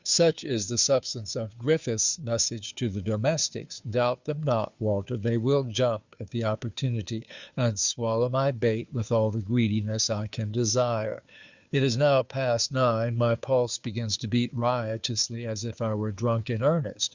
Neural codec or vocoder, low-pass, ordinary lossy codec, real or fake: codec, 16 kHz, 2 kbps, FunCodec, trained on Chinese and English, 25 frames a second; 7.2 kHz; Opus, 64 kbps; fake